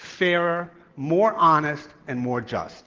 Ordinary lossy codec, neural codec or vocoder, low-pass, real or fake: Opus, 16 kbps; none; 7.2 kHz; real